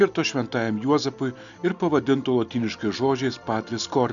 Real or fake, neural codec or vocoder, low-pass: real; none; 7.2 kHz